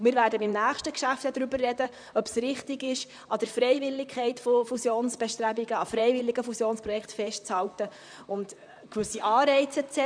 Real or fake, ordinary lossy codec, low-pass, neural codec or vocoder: fake; none; 9.9 kHz; vocoder, 44.1 kHz, 128 mel bands, Pupu-Vocoder